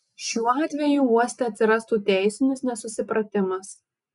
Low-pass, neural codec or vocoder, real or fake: 10.8 kHz; none; real